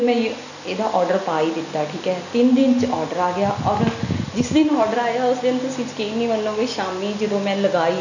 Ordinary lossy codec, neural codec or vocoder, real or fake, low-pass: none; none; real; 7.2 kHz